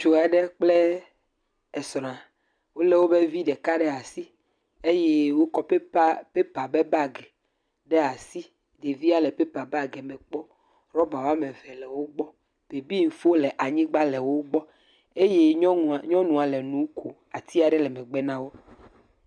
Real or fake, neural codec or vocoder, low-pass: real; none; 9.9 kHz